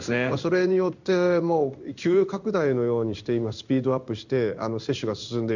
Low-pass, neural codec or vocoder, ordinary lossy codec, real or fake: 7.2 kHz; codec, 16 kHz in and 24 kHz out, 1 kbps, XY-Tokenizer; none; fake